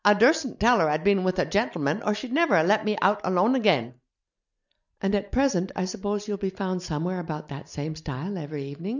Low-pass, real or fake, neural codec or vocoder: 7.2 kHz; real; none